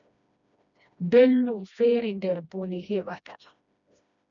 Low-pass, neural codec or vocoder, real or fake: 7.2 kHz; codec, 16 kHz, 1 kbps, FreqCodec, smaller model; fake